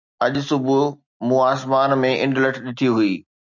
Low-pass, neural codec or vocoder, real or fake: 7.2 kHz; none; real